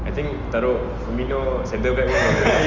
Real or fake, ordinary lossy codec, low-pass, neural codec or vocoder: real; Opus, 32 kbps; 7.2 kHz; none